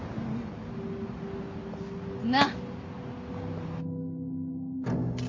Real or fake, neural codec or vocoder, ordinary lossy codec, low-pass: real; none; MP3, 32 kbps; 7.2 kHz